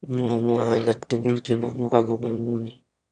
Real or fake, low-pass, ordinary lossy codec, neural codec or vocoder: fake; 9.9 kHz; none; autoencoder, 22.05 kHz, a latent of 192 numbers a frame, VITS, trained on one speaker